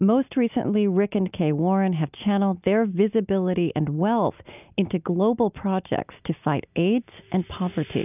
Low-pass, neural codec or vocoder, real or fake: 3.6 kHz; none; real